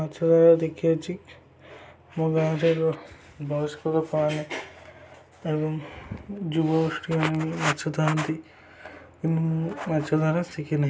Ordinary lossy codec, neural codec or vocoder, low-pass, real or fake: none; none; none; real